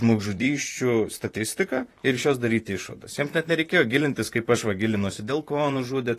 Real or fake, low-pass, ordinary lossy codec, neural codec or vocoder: fake; 14.4 kHz; AAC, 48 kbps; vocoder, 44.1 kHz, 128 mel bands, Pupu-Vocoder